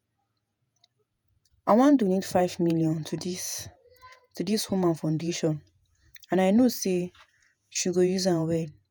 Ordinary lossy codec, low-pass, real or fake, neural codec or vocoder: none; none; fake; vocoder, 48 kHz, 128 mel bands, Vocos